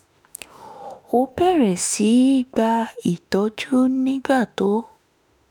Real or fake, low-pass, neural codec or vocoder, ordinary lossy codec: fake; none; autoencoder, 48 kHz, 32 numbers a frame, DAC-VAE, trained on Japanese speech; none